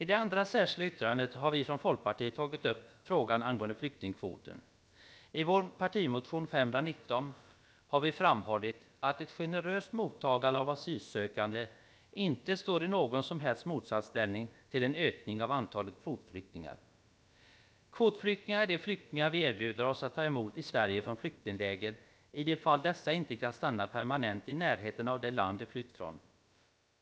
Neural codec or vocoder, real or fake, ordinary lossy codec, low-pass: codec, 16 kHz, about 1 kbps, DyCAST, with the encoder's durations; fake; none; none